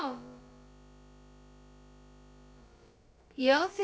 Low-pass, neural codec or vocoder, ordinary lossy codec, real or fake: none; codec, 16 kHz, about 1 kbps, DyCAST, with the encoder's durations; none; fake